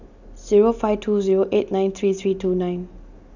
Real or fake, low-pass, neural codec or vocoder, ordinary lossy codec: real; 7.2 kHz; none; none